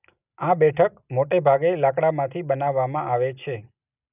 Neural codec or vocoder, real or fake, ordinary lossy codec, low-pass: none; real; none; 3.6 kHz